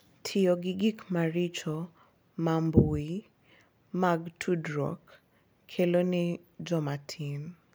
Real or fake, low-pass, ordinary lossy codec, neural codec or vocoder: real; none; none; none